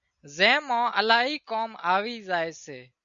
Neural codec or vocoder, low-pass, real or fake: none; 7.2 kHz; real